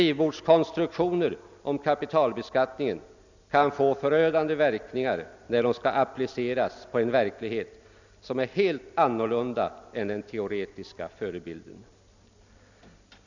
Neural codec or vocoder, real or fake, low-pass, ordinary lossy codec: none; real; 7.2 kHz; none